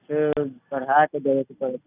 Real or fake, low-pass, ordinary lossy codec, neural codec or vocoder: real; 3.6 kHz; none; none